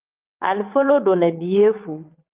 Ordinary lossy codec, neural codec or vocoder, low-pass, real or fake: Opus, 32 kbps; vocoder, 22.05 kHz, 80 mel bands, WaveNeXt; 3.6 kHz; fake